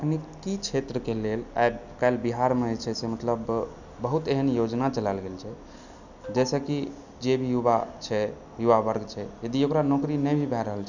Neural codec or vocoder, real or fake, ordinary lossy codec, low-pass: none; real; none; 7.2 kHz